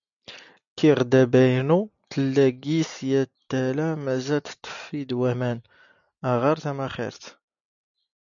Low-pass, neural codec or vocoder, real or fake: 7.2 kHz; none; real